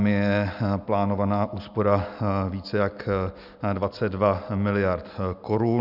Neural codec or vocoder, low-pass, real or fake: none; 5.4 kHz; real